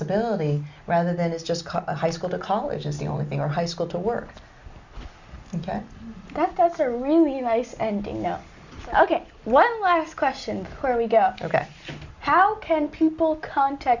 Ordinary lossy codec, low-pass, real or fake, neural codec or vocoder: Opus, 64 kbps; 7.2 kHz; real; none